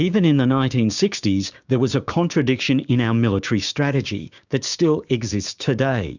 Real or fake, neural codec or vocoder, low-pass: fake; codec, 16 kHz, 6 kbps, DAC; 7.2 kHz